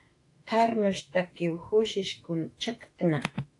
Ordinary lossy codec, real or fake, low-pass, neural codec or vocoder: AAC, 48 kbps; fake; 10.8 kHz; autoencoder, 48 kHz, 32 numbers a frame, DAC-VAE, trained on Japanese speech